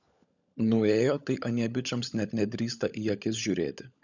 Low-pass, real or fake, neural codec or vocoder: 7.2 kHz; fake; codec, 16 kHz, 16 kbps, FunCodec, trained on LibriTTS, 50 frames a second